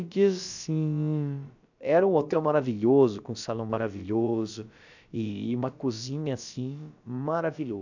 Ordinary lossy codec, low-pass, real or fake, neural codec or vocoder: none; 7.2 kHz; fake; codec, 16 kHz, about 1 kbps, DyCAST, with the encoder's durations